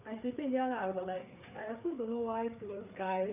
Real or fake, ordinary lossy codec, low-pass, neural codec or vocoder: fake; none; 3.6 kHz; codec, 16 kHz, 4 kbps, FreqCodec, larger model